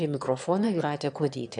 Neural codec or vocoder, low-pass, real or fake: autoencoder, 22.05 kHz, a latent of 192 numbers a frame, VITS, trained on one speaker; 9.9 kHz; fake